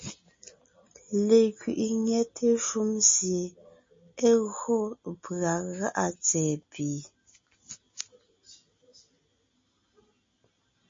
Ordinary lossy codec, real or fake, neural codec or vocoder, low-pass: MP3, 32 kbps; real; none; 7.2 kHz